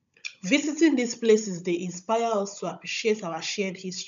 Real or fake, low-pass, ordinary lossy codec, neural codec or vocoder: fake; 7.2 kHz; AAC, 96 kbps; codec, 16 kHz, 16 kbps, FunCodec, trained on Chinese and English, 50 frames a second